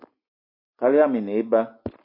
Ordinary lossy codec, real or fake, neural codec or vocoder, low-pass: MP3, 32 kbps; real; none; 5.4 kHz